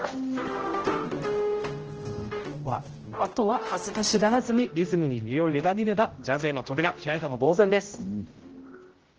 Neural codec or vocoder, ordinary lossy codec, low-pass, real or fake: codec, 16 kHz, 0.5 kbps, X-Codec, HuBERT features, trained on general audio; Opus, 16 kbps; 7.2 kHz; fake